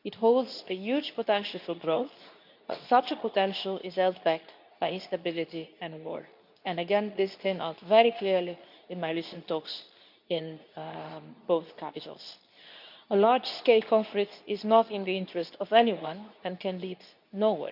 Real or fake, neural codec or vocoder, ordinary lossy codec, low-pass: fake; codec, 24 kHz, 0.9 kbps, WavTokenizer, medium speech release version 2; none; 5.4 kHz